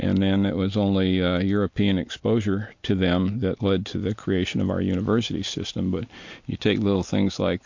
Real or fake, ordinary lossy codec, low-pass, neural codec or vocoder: real; MP3, 48 kbps; 7.2 kHz; none